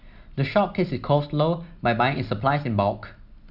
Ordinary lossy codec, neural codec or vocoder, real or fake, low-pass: none; none; real; 5.4 kHz